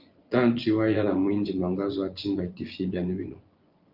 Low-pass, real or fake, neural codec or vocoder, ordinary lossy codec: 5.4 kHz; fake; vocoder, 24 kHz, 100 mel bands, Vocos; Opus, 32 kbps